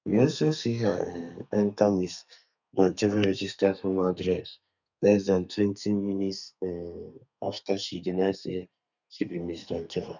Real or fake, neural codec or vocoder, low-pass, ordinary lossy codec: fake; codec, 32 kHz, 1.9 kbps, SNAC; 7.2 kHz; none